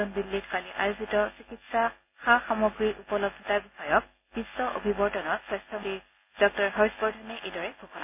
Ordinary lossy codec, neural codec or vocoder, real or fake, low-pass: MP3, 24 kbps; none; real; 3.6 kHz